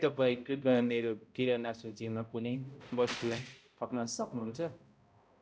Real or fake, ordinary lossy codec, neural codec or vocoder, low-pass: fake; none; codec, 16 kHz, 0.5 kbps, X-Codec, HuBERT features, trained on balanced general audio; none